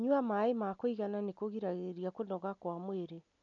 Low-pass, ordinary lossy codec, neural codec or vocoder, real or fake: 7.2 kHz; none; none; real